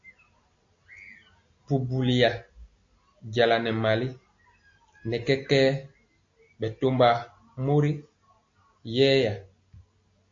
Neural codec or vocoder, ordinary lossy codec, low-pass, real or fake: none; AAC, 48 kbps; 7.2 kHz; real